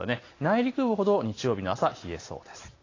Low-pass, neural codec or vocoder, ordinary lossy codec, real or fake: 7.2 kHz; none; AAC, 32 kbps; real